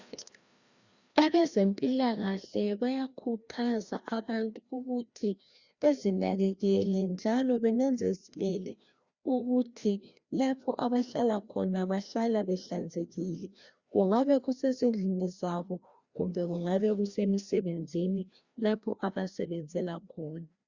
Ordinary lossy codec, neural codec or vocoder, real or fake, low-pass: Opus, 64 kbps; codec, 16 kHz, 1 kbps, FreqCodec, larger model; fake; 7.2 kHz